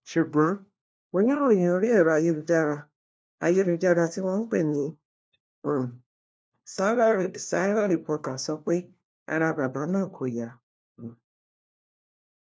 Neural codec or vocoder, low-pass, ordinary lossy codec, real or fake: codec, 16 kHz, 1 kbps, FunCodec, trained on LibriTTS, 50 frames a second; none; none; fake